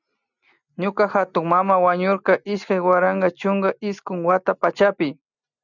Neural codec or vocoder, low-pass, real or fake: none; 7.2 kHz; real